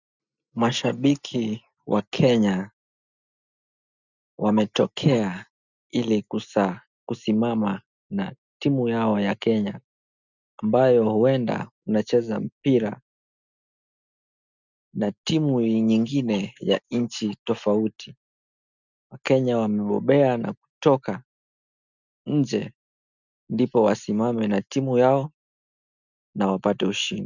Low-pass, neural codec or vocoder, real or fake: 7.2 kHz; none; real